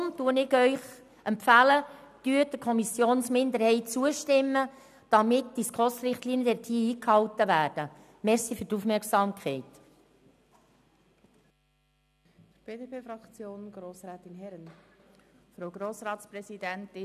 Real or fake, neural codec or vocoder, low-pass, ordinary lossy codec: real; none; 14.4 kHz; none